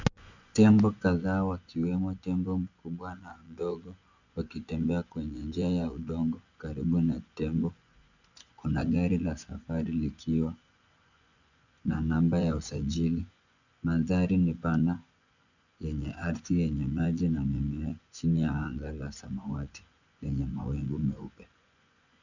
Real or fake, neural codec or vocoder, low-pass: fake; vocoder, 24 kHz, 100 mel bands, Vocos; 7.2 kHz